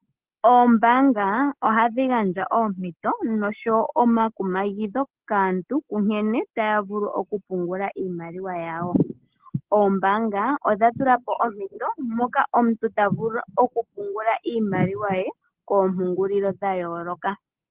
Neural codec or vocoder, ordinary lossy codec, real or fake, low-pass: none; Opus, 16 kbps; real; 3.6 kHz